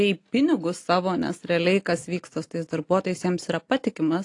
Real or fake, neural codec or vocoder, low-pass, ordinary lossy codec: real; none; 10.8 kHz; AAC, 48 kbps